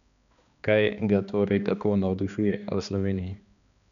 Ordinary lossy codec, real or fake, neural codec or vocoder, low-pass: none; fake; codec, 16 kHz, 2 kbps, X-Codec, HuBERT features, trained on balanced general audio; 7.2 kHz